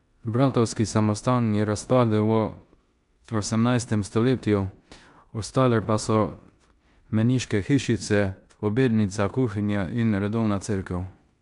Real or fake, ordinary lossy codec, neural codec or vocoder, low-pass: fake; none; codec, 16 kHz in and 24 kHz out, 0.9 kbps, LongCat-Audio-Codec, four codebook decoder; 10.8 kHz